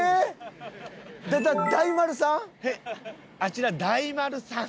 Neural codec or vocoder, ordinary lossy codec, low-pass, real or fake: none; none; none; real